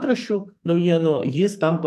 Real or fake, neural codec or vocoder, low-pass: fake; codec, 44.1 kHz, 2.6 kbps, SNAC; 14.4 kHz